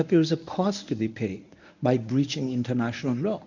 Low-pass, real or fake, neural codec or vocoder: 7.2 kHz; fake; codec, 24 kHz, 0.9 kbps, WavTokenizer, medium speech release version 1